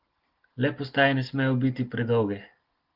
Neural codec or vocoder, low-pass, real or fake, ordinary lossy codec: none; 5.4 kHz; real; Opus, 16 kbps